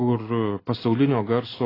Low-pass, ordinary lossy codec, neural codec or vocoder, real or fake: 5.4 kHz; AAC, 24 kbps; none; real